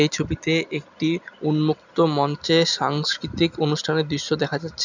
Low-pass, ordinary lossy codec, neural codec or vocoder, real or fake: 7.2 kHz; none; none; real